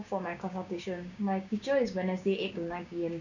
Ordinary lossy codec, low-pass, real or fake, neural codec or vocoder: none; 7.2 kHz; fake; codec, 16 kHz, 6 kbps, DAC